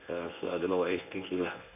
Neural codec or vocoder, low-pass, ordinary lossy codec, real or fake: codec, 24 kHz, 0.9 kbps, WavTokenizer, medium speech release version 1; 3.6 kHz; none; fake